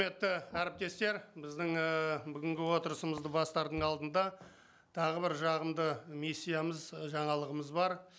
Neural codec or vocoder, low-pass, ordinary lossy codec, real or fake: none; none; none; real